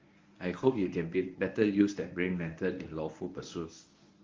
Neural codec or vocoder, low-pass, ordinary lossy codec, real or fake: codec, 24 kHz, 0.9 kbps, WavTokenizer, medium speech release version 1; 7.2 kHz; Opus, 32 kbps; fake